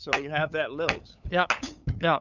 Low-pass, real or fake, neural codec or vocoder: 7.2 kHz; fake; codec, 16 kHz, 8 kbps, FunCodec, trained on LibriTTS, 25 frames a second